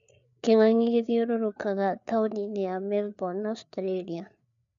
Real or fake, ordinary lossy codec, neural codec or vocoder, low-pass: fake; none; codec, 16 kHz, 4 kbps, FreqCodec, larger model; 7.2 kHz